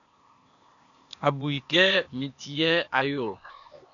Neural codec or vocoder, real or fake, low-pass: codec, 16 kHz, 0.8 kbps, ZipCodec; fake; 7.2 kHz